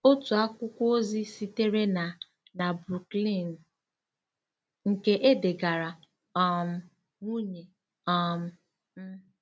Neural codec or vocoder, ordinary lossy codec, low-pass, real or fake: none; none; none; real